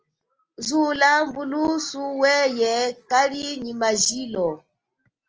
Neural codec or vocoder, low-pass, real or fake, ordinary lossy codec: none; 7.2 kHz; real; Opus, 24 kbps